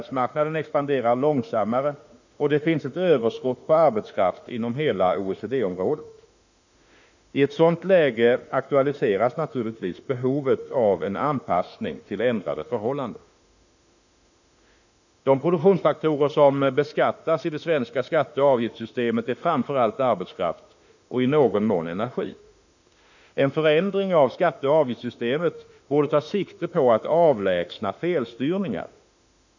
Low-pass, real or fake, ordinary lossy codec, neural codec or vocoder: 7.2 kHz; fake; none; autoencoder, 48 kHz, 32 numbers a frame, DAC-VAE, trained on Japanese speech